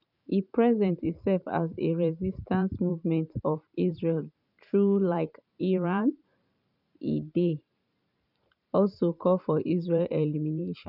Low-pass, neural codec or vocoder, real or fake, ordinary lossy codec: 5.4 kHz; vocoder, 44.1 kHz, 128 mel bands every 512 samples, BigVGAN v2; fake; none